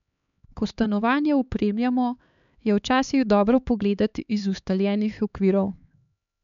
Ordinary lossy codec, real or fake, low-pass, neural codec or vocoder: none; fake; 7.2 kHz; codec, 16 kHz, 4 kbps, X-Codec, HuBERT features, trained on LibriSpeech